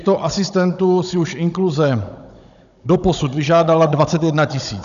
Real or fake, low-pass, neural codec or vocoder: fake; 7.2 kHz; codec, 16 kHz, 16 kbps, FunCodec, trained on Chinese and English, 50 frames a second